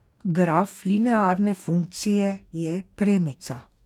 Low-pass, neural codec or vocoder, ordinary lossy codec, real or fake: 19.8 kHz; codec, 44.1 kHz, 2.6 kbps, DAC; none; fake